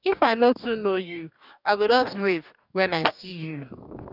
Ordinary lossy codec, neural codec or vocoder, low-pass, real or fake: none; codec, 44.1 kHz, 2.6 kbps, DAC; 5.4 kHz; fake